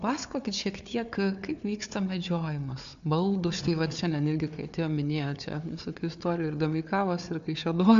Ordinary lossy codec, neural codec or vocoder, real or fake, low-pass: AAC, 64 kbps; codec, 16 kHz, 4 kbps, FunCodec, trained on Chinese and English, 50 frames a second; fake; 7.2 kHz